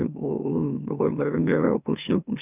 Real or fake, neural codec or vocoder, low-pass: fake; autoencoder, 44.1 kHz, a latent of 192 numbers a frame, MeloTTS; 3.6 kHz